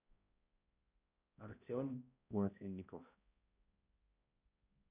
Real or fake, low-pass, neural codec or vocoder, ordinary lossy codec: fake; 3.6 kHz; codec, 16 kHz, 0.5 kbps, X-Codec, HuBERT features, trained on balanced general audio; none